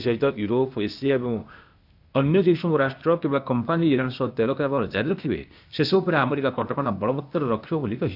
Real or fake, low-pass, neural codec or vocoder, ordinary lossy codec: fake; 5.4 kHz; codec, 16 kHz, 0.8 kbps, ZipCodec; none